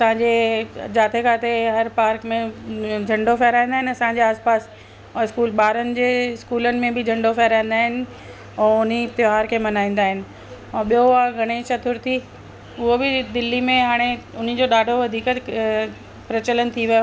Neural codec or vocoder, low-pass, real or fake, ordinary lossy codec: none; none; real; none